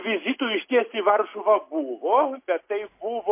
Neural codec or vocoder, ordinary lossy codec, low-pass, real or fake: none; MP3, 24 kbps; 3.6 kHz; real